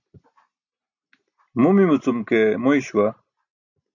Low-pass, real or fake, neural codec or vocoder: 7.2 kHz; real; none